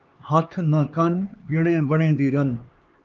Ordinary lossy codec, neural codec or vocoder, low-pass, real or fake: Opus, 24 kbps; codec, 16 kHz, 2 kbps, X-Codec, HuBERT features, trained on LibriSpeech; 7.2 kHz; fake